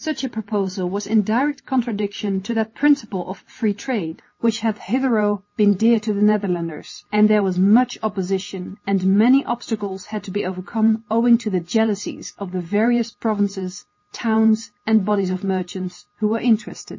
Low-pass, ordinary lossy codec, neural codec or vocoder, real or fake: 7.2 kHz; MP3, 32 kbps; none; real